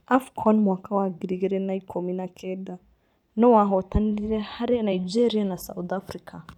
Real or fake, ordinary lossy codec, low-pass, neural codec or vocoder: fake; none; 19.8 kHz; vocoder, 44.1 kHz, 128 mel bands every 512 samples, BigVGAN v2